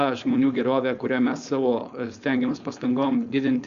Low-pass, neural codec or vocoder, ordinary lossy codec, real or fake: 7.2 kHz; codec, 16 kHz, 4.8 kbps, FACodec; Opus, 64 kbps; fake